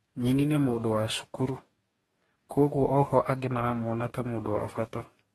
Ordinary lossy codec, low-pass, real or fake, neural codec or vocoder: AAC, 32 kbps; 19.8 kHz; fake; codec, 44.1 kHz, 2.6 kbps, DAC